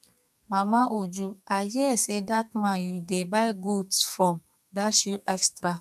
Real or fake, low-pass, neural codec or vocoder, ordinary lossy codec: fake; 14.4 kHz; codec, 32 kHz, 1.9 kbps, SNAC; none